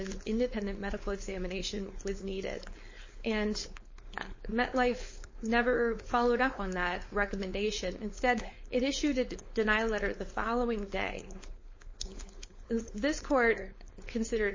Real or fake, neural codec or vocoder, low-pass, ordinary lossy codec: fake; codec, 16 kHz, 4.8 kbps, FACodec; 7.2 kHz; MP3, 32 kbps